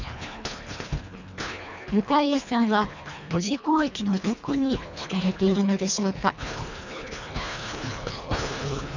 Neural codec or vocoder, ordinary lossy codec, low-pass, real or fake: codec, 24 kHz, 1.5 kbps, HILCodec; none; 7.2 kHz; fake